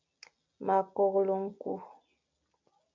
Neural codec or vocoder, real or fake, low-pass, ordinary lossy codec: none; real; 7.2 kHz; MP3, 48 kbps